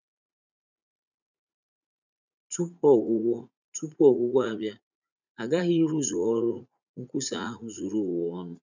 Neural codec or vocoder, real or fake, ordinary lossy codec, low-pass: vocoder, 44.1 kHz, 80 mel bands, Vocos; fake; none; 7.2 kHz